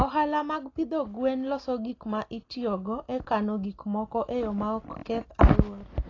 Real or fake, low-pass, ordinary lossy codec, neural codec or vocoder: real; 7.2 kHz; AAC, 32 kbps; none